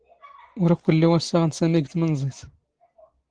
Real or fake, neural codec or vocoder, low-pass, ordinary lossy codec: real; none; 9.9 kHz; Opus, 16 kbps